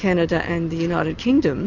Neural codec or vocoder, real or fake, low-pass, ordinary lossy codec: none; real; 7.2 kHz; MP3, 64 kbps